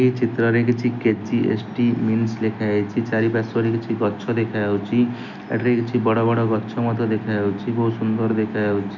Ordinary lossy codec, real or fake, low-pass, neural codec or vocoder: none; real; 7.2 kHz; none